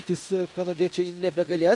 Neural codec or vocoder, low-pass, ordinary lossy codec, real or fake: codec, 16 kHz in and 24 kHz out, 0.9 kbps, LongCat-Audio-Codec, fine tuned four codebook decoder; 10.8 kHz; AAC, 64 kbps; fake